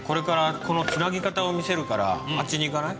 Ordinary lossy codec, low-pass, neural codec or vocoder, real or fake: none; none; none; real